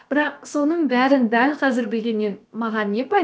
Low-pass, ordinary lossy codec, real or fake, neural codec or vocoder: none; none; fake; codec, 16 kHz, about 1 kbps, DyCAST, with the encoder's durations